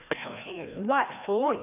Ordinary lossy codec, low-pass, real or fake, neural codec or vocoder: none; 3.6 kHz; fake; codec, 16 kHz, 1 kbps, FreqCodec, larger model